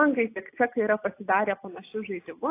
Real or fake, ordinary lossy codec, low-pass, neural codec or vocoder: real; AAC, 24 kbps; 3.6 kHz; none